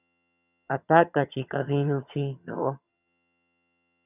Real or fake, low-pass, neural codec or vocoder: fake; 3.6 kHz; vocoder, 22.05 kHz, 80 mel bands, HiFi-GAN